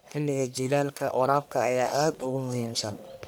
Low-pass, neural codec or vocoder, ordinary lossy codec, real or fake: none; codec, 44.1 kHz, 1.7 kbps, Pupu-Codec; none; fake